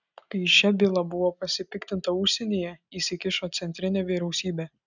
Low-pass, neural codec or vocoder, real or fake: 7.2 kHz; none; real